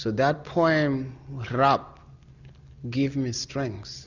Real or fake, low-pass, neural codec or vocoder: real; 7.2 kHz; none